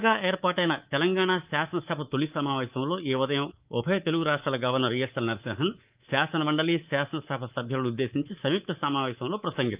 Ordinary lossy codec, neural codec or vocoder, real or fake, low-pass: Opus, 32 kbps; codec, 24 kHz, 3.1 kbps, DualCodec; fake; 3.6 kHz